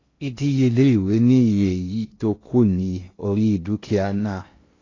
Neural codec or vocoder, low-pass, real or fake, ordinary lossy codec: codec, 16 kHz in and 24 kHz out, 0.6 kbps, FocalCodec, streaming, 2048 codes; 7.2 kHz; fake; AAC, 32 kbps